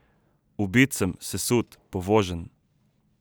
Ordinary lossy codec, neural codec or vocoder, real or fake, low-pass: none; none; real; none